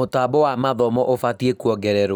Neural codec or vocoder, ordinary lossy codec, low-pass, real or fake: none; none; 19.8 kHz; real